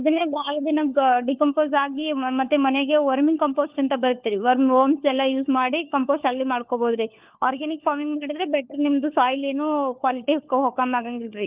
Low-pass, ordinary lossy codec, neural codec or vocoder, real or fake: 3.6 kHz; Opus, 32 kbps; codec, 16 kHz, 4 kbps, FunCodec, trained on LibriTTS, 50 frames a second; fake